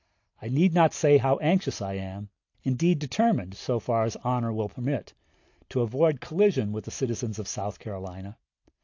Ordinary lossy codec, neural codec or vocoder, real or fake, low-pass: AAC, 48 kbps; none; real; 7.2 kHz